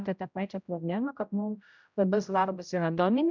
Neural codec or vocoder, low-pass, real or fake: codec, 16 kHz, 0.5 kbps, X-Codec, HuBERT features, trained on general audio; 7.2 kHz; fake